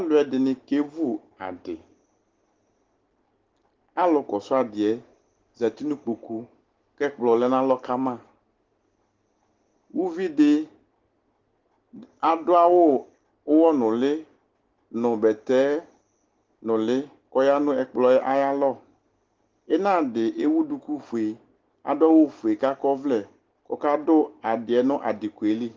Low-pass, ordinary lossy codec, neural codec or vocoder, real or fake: 7.2 kHz; Opus, 16 kbps; none; real